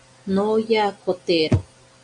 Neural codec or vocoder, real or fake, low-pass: none; real; 9.9 kHz